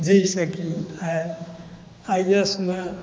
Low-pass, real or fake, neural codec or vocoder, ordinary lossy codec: none; fake; codec, 16 kHz, 2 kbps, X-Codec, HuBERT features, trained on general audio; none